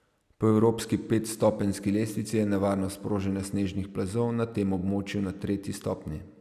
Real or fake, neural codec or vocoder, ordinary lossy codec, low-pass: real; none; none; 14.4 kHz